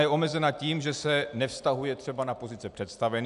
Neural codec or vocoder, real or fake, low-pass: none; real; 10.8 kHz